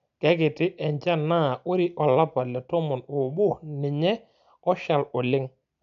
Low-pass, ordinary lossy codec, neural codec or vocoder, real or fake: 7.2 kHz; none; none; real